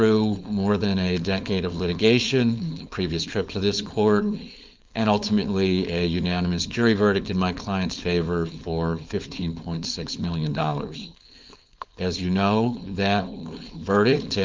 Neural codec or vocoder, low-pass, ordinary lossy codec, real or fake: codec, 16 kHz, 4.8 kbps, FACodec; 7.2 kHz; Opus, 32 kbps; fake